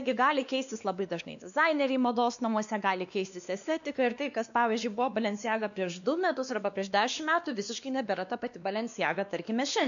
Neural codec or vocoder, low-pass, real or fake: codec, 16 kHz, 2 kbps, X-Codec, WavLM features, trained on Multilingual LibriSpeech; 7.2 kHz; fake